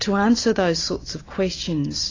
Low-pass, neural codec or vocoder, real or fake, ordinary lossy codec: 7.2 kHz; none; real; AAC, 32 kbps